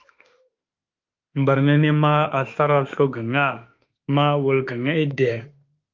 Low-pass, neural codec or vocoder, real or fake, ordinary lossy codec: 7.2 kHz; autoencoder, 48 kHz, 32 numbers a frame, DAC-VAE, trained on Japanese speech; fake; Opus, 24 kbps